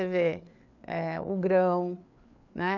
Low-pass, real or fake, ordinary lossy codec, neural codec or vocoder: 7.2 kHz; fake; none; codec, 16 kHz, 4 kbps, FreqCodec, larger model